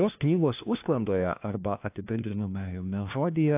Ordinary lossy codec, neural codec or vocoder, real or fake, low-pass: AAC, 32 kbps; codec, 16 kHz, 1 kbps, FunCodec, trained on LibriTTS, 50 frames a second; fake; 3.6 kHz